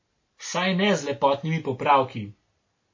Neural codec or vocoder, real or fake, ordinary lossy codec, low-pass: none; real; MP3, 32 kbps; 7.2 kHz